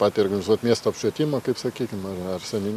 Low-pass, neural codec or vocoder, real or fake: 14.4 kHz; none; real